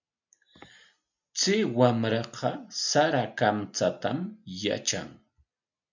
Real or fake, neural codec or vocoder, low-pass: real; none; 7.2 kHz